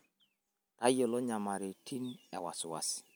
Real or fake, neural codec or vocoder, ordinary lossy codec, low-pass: real; none; none; none